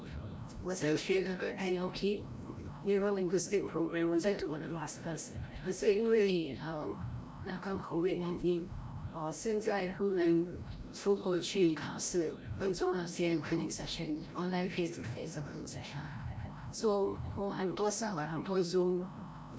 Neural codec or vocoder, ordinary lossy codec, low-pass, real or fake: codec, 16 kHz, 0.5 kbps, FreqCodec, larger model; none; none; fake